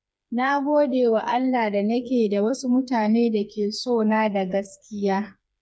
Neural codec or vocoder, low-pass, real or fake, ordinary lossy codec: codec, 16 kHz, 4 kbps, FreqCodec, smaller model; none; fake; none